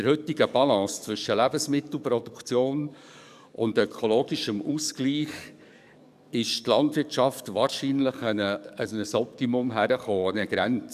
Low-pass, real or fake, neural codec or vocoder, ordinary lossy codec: 14.4 kHz; fake; codec, 44.1 kHz, 7.8 kbps, DAC; none